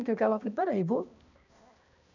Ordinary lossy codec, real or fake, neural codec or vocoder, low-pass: none; fake; codec, 16 kHz, 1 kbps, X-Codec, HuBERT features, trained on balanced general audio; 7.2 kHz